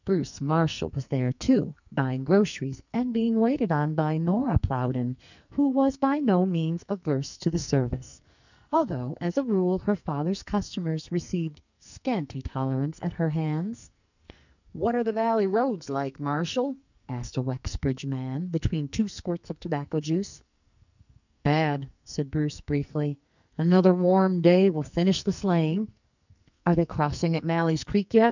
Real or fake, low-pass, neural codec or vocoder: fake; 7.2 kHz; codec, 44.1 kHz, 2.6 kbps, SNAC